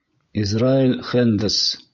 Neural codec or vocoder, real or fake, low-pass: vocoder, 24 kHz, 100 mel bands, Vocos; fake; 7.2 kHz